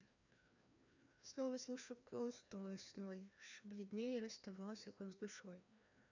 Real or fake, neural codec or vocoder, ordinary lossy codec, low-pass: fake; codec, 16 kHz, 1 kbps, FreqCodec, larger model; none; 7.2 kHz